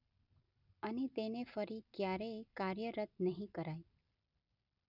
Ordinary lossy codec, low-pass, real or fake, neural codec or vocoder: none; 5.4 kHz; real; none